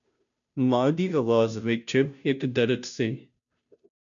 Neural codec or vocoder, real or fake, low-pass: codec, 16 kHz, 0.5 kbps, FunCodec, trained on Chinese and English, 25 frames a second; fake; 7.2 kHz